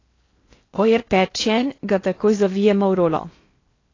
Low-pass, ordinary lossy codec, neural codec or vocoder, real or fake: 7.2 kHz; AAC, 32 kbps; codec, 16 kHz in and 24 kHz out, 0.6 kbps, FocalCodec, streaming, 4096 codes; fake